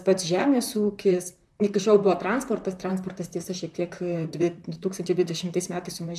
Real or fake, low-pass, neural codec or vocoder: fake; 14.4 kHz; vocoder, 44.1 kHz, 128 mel bands, Pupu-Vocoder